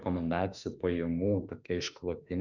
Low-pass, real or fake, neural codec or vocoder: 7.2 kHz; fake; autoencoder, 48 kHz, 32 numbers a frame, DAC-VAE, trained on Japanese speech